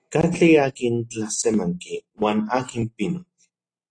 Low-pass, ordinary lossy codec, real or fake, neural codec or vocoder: 9.9 kHz; AAC, 32 kbps; real; none